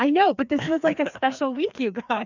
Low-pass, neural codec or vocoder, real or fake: 7.2 kHz; codec, 16 kHz, 2 kbps, FreqCodec, larger model; fake